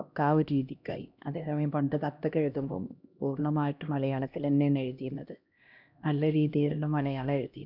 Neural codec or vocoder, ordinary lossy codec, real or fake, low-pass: codec, 16 kHz, 1 kbps, X-Codec, HuBERT features, trained on LibriSpeech; none; fake; 5.4 kHz